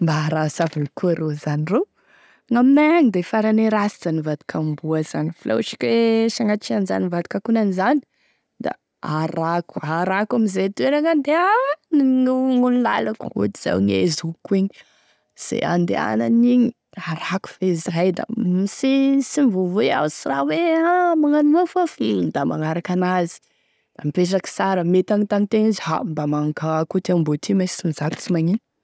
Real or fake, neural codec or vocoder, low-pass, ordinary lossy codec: real; none; none; none